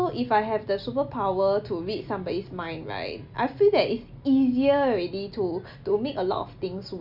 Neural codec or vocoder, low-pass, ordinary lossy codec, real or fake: none; 5.4 kHz; none; real